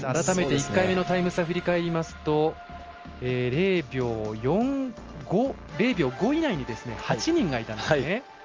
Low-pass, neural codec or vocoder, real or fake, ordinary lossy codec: 7.2 kHz; none; real; Opus, 24 kbps